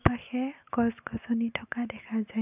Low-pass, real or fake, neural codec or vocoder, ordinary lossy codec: 3.6 kHz; real; none; none